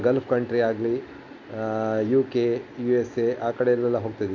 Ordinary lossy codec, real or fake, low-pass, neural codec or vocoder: MP3, 64 kbps; real; 7.2 kHz; none